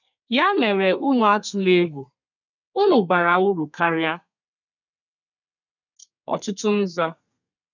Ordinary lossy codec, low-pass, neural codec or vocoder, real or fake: none; 7.2 kHz; codec, 32 kHz, 1.9 kbps, SNAC; fake